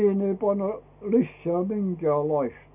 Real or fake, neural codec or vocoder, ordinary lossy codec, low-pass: real; none; none; 3.6 kHz